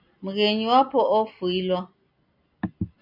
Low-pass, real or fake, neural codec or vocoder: 5.4 kHz; real; none